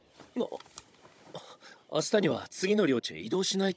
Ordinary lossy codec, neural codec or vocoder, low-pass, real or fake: none; codec, 16 kHz, 16 kbps, FunCodec, trained on Chinese and English, 50 frames a second; none; fake